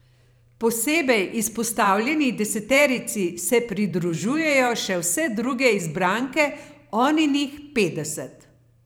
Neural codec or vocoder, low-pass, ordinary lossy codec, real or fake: vocoder, 44.1 kHz, 128 mel bands every 512 samples, BigVGAN v2; none; none; fake